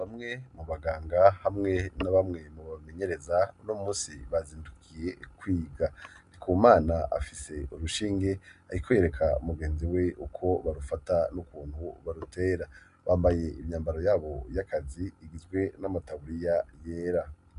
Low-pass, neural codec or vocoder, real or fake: 10.8 kHz; none; real